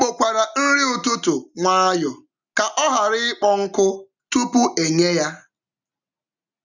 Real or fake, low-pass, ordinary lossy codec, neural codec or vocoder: real; 7.2 kHz; none; none